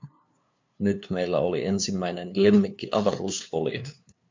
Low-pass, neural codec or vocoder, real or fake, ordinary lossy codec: 7.2 kHz; codec, 16 kHz, 2 kbps, FunCodec, trained on LibriTTS, 25 frames a second; fake; MP3, 64 kbps